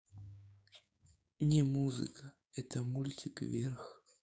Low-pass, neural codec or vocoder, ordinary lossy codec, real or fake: none; codec, 16 kHz, 6 kbps, DAC; none; fake